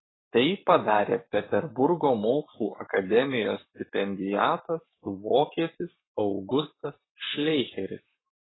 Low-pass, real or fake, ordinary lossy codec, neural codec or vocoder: 7.2 kHz; fake; AAC, 16 kbps; codec, 44.1 kHz, 7.8 kbps, Pupu-Codec